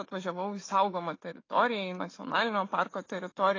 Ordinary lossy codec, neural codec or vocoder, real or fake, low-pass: AAC, 32 kbps; autoencoder, 48 kHz, 128 numbers a frame, DAC-VAE, trained on Japanese speech; fake; 7.2 kHz